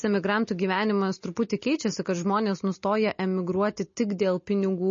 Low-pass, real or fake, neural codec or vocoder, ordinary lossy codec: 7.2 kHz; real; none; MP3, 32 kbps